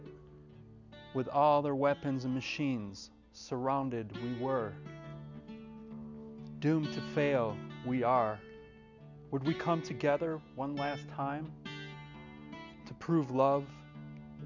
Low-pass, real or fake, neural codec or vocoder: 7.2 kHz; real; none